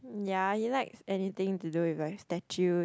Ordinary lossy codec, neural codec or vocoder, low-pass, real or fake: none; none; none; real